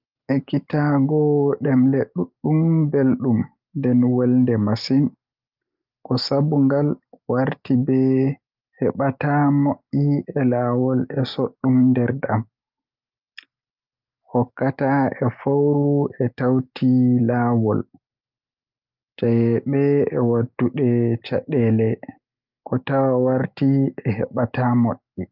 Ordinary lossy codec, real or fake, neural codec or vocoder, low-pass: Opus, 32 kbps; real; none; 5.4 kHz